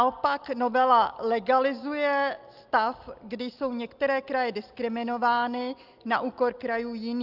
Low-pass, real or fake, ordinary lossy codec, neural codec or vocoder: 5.4 kHz; real; Opus, 24 kbps; none